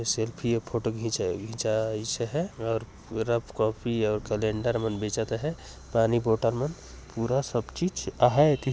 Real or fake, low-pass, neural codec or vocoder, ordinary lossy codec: real; none; none; none